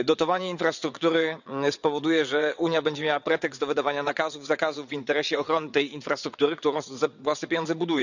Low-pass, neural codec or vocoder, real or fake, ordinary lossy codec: 7.2 kHz; vocoder, 22.05 kHz, 80 mel bands, WaveNeXt; fake; none